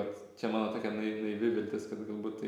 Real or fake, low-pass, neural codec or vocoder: real; 19.8 kHz; none